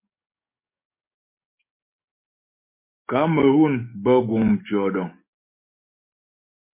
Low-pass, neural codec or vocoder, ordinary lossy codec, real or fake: 3.6 kHz; codec, 44.1 kHz, 7.8 kbps, DAC; MP3, 24 kbps; fake